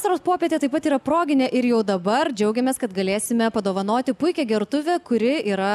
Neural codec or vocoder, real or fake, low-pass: none; real; 14.4 kHz